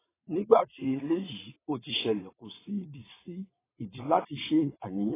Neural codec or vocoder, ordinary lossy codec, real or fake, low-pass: vocoder, 22.05 kHz, 80 mel bands, WaveNeXt; AAC, 16 kbps; fake; 3.6 kHz